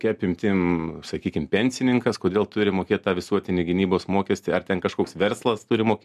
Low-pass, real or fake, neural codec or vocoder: 14.4 kHz; real; none